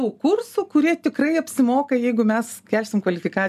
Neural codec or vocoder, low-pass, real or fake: none; 14.4 kHz; real